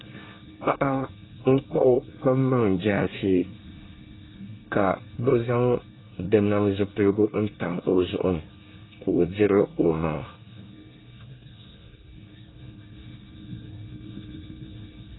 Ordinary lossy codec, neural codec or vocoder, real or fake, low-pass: AAC, 16 kbps; codec, 24 kHz, 1 kbps, SNAC; fake; 7.2 kHz